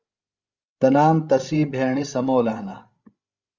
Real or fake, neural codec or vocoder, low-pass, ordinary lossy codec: fake; codec, 16 kHz, 16 kbps, FreqCodec, larger model; 7.2 kHz; Opus, 32 kbps